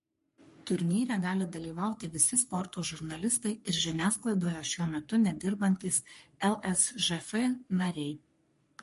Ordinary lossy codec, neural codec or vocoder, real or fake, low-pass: MP3, 48 kbps; codec, 44.1 kHz, 3.4 kbps, Pupu-Codec; fake; 14.4 kHz